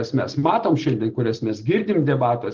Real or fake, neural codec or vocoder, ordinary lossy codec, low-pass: real; none; Opus, 16 kbps; 7.2 kHz